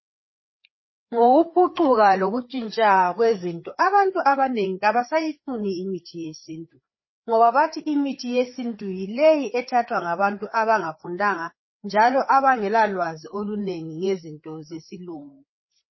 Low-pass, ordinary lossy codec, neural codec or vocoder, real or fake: 7.2 kHz; MP3, 24 kbps; codec, 16 kHz, 4 kbps, FreqCodec, larger model; fake